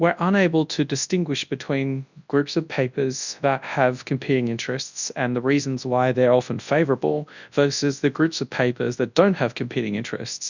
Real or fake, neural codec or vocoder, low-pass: fake; codec, 24 kHz, 0.9 kbps, WavTokenizer, large speech release; 7.2 kHz